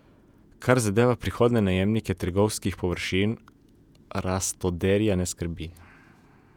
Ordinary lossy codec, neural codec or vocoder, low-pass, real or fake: none; vocoder, 48 kHz, 128 mel bands, Vocos; 19.8 kHz; fake